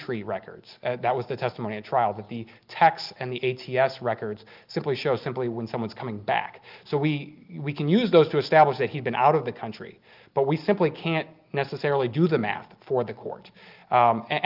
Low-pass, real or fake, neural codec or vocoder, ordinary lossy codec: 5.4 kHz; real; none; Opus, 32 kbps